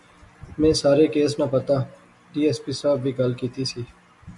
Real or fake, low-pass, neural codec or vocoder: real; 10.8 kHz; none